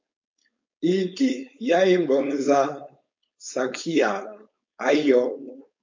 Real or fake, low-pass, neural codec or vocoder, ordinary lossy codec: fake; 7.2 kHz; codec, 16 kHz, 4.8 kbps, FACodec; MP3, 48 kbps